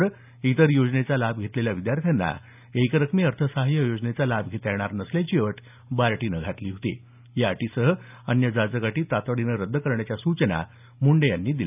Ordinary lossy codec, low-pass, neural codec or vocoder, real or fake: none; 3.6 kHz; none; real